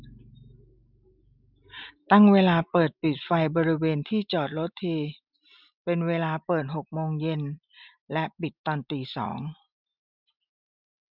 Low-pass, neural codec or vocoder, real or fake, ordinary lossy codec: 5.4 kHz; none; real; none